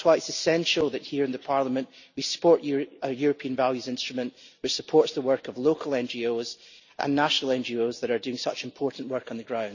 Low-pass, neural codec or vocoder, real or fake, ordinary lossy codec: 7.2 kHz; none; real; none